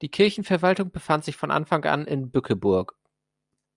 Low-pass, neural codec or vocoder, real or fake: 10.8 kHz; none; real